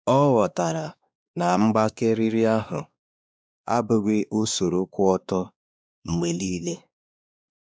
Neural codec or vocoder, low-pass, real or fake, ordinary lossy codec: codec, 16 kHz, 2 kbps, X-Codec, WavLM features, trained on Multilingual LibriSpeech; none; fake; none